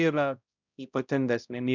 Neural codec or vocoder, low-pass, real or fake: codec, 16 kHz, 0.5 kbps, X-Codec, HuBERT features, trained on balanced general audio; 7.2 kHz; fake